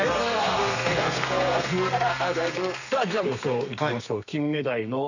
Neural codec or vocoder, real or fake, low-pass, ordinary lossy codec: codec, 32 kHz, 1.9 kbps, SNAC; fake; 7.2 kHz; none